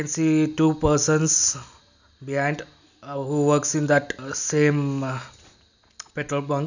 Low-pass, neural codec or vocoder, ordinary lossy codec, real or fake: 7.2 kHz; none; none; real